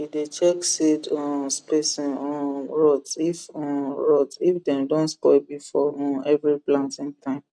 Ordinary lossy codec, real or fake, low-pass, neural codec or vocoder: none; real; none; none